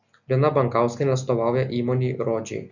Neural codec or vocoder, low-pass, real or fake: none; 7.2 kHz; real